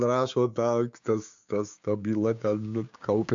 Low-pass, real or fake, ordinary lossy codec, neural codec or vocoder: 7.2 kHz; fake; MP3, 64 kbps; codec, 16 kHz, 6 kbps, DAC